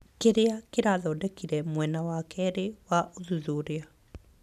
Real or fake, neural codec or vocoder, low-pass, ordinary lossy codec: real; none; 14.4 kHz; none